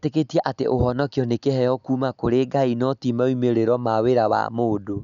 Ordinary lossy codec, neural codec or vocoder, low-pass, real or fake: none; none; 7.2 kHz; real